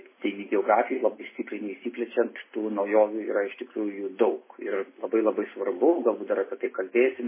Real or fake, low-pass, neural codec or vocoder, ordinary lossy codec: real; 3.6 kHz; none; MP3, 16 kbps